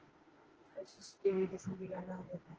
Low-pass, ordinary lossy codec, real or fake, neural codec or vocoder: 7.2 kHz; Opus, 16 kbps; fake; autoencoder, 48 kHz, 32 numbers a frame, DAC-VAE, trained on Japanese speech